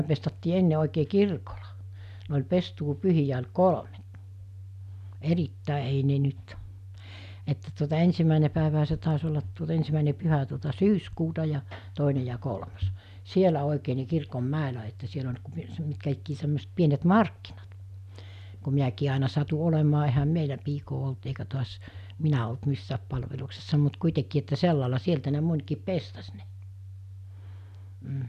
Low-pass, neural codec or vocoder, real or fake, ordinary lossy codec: 14.4 kHz; none; real; none